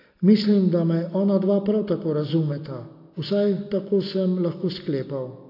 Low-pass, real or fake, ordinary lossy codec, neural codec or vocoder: 5.4 kHz; real; AAC, 32 kbps; none